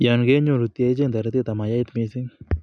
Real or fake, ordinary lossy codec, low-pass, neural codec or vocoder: real; none; none; none